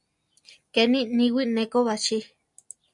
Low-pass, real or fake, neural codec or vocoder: 10.8 kHz; real; none